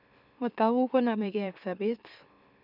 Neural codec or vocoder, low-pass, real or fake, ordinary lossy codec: autoencoder, 44.1 kHz, a latent of 192 numbers a frame, MeloTTS; 5.4 kHz; fake; none